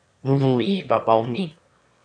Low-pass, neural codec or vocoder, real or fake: 9.9 kHz; autoencoder, 22.05 kHz, a latent of 192 numbers a frame, VITS, trained on one speaker; fake